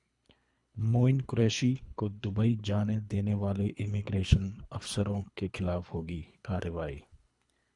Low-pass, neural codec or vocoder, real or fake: 10.8 kHz; codec, 24 kHz, 3 kbps, HILCodec; fake